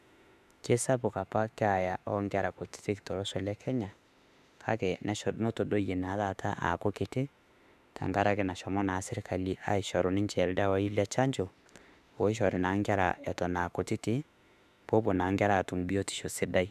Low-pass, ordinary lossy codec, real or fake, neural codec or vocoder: 14.4 kHz; none; fake; autoencoder, 48 kHz, 32 numbers a frame, DAC-VAE, trained on Japanese speech